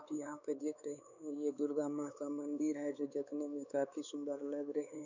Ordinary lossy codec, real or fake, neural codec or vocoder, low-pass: none; fake; codec, 16 kHz, 4 kbps, X-Codec, WavLM features, trained on Multilingual LibriSpeech; 7.2 kHz